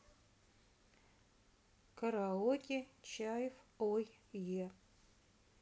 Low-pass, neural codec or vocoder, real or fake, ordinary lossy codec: none; none; real; none